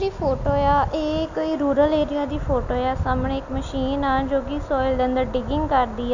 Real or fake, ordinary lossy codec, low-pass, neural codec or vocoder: real; none; 7.2 kHz; none